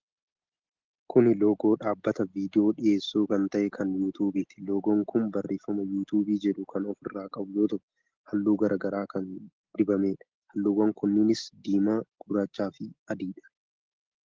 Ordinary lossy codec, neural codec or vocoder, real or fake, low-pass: Opus, 16 kbps; none; real; 7.2 kHz